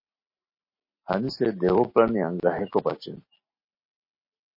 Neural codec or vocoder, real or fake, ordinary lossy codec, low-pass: none; real; MP3, 24 kbps; 5.4 kHz